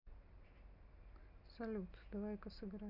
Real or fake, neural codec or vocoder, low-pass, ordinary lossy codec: real; none; 5.4 kHz; none